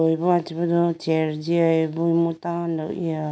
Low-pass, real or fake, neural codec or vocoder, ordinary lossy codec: none; real; none; none